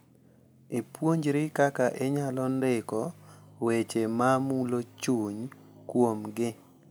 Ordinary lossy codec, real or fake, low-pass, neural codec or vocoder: none; real; none; none